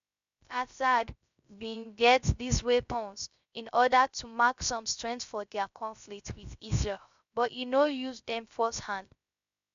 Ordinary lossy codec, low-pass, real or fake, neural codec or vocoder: MP3, 64 kbps; 7.2 kHz; fake; codec, 16 kHz, 0.3 kbps, FocalCodec